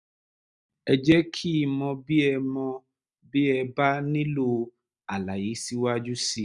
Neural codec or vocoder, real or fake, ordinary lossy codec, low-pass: none; real; none; 10.8 kHz